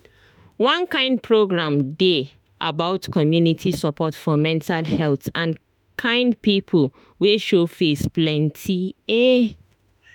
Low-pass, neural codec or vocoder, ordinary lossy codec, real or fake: 19.8 kHz; autoencoder, 48 kHz, 32 numbers a frame, DAC-VAE, trained on Japanese speech; none; fake